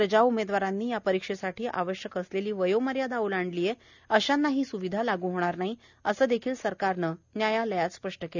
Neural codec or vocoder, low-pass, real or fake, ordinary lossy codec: none; 7.2 kHz; real; none